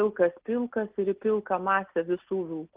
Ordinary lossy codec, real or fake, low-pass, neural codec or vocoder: Opus, 16 kbps; real; 3.6 kHz; none